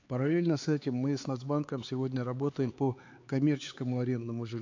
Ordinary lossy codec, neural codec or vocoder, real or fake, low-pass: AAC, 48 kbps; codec, 16 kHz, 4 kbps, X-Codec, HuBERT features, trained on LibriSpeech; fake; 7.2 kHz